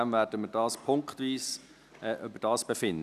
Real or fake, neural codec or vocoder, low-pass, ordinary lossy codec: real; none; 14.4 kHz; none